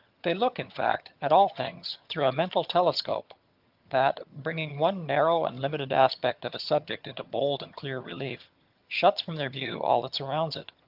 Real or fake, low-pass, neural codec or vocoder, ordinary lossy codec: fake; 5.4 kHz; vocoder, 22.05 kHz, 80 mel bands, HiFi-GAN; Opus, 32 kbps